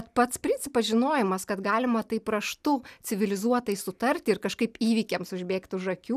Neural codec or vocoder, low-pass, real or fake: none; 14.4 kHz; real